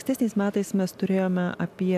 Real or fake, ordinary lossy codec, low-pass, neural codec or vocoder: real; AAC, 96 kbps; 14.4 kHz; none